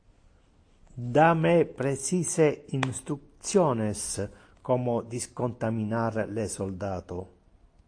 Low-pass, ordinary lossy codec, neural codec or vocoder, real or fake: 9.9 kHz; AAC, 48 kbps; none; real